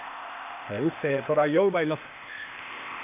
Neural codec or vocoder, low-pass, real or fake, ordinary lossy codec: codec, 16 kHz, 0.8 kbps, ZipCodec; 3.6 kHz; fake; none